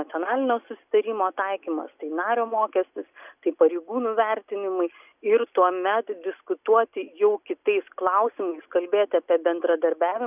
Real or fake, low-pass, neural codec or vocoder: real; 3.6 kHz; none